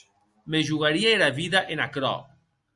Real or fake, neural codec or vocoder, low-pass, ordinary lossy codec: real; none; 10.8 kHz; Opus, 64 kbps